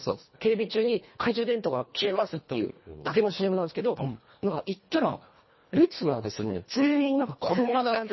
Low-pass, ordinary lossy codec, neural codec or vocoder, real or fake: 7.2 kHz; MP3, 24 kbps; codec, 24 kHz, 1.5 kbps, HILCodec; fake